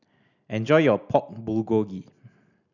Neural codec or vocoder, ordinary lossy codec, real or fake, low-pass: none; none; real; 7.2 kHz